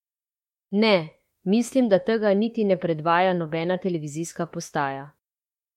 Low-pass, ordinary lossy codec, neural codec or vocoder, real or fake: 19.8 kHz; MP3, 64 kbps; autoencoder, 48 kHz, 32 numbers a frame, DAC-VAE, trained on Japanese speech; fake